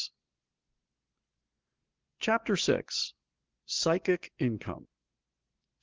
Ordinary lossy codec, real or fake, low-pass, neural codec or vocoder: Opus, 16 kbps; real; 7.2 kHz; none